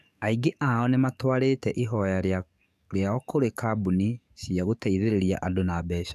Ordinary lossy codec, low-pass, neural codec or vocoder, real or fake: none; 14.4 kHz; codec, 44.1 kHz, 7.8 kbps, DAC; fake